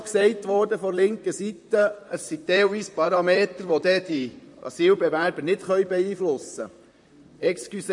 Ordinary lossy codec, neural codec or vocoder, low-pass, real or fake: MP3, 48 kbps; vocoder, 44.1 kHz, 128 mel bands, Pupu-Vocoder; 10.8 kHz; fake